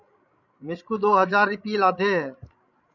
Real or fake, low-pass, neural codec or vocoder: fake; 7.2 kHz; codec, 16 kHz, 16 kbps, FreqCodec, larger model